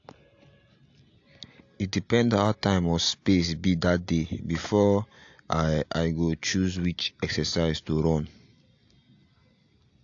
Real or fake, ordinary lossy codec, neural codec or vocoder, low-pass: real; AAC, 48 kbps; none; 7.2 kHz